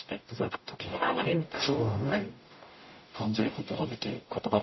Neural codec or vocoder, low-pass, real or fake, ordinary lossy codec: codec, 44.1 kHz, 0.9 kbps, DAC; 7.2 kHz; fake; MP3, 24 kbps